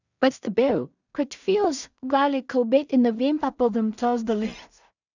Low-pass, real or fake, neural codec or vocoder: 7.2 kHz; fake; codec, 16 kHz in and 24 kHz out, 0.4 kbps, LongCat-Audio-Codec, two codebook decoder